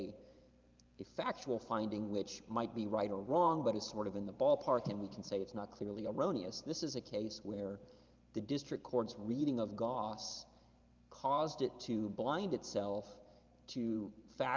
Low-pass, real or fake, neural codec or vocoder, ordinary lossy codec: 7.2 kHz; fake; vocoder, 44.1 kHz, 128 mel bands every 512 samples, BigVGAN v2; Opus, 24 kbps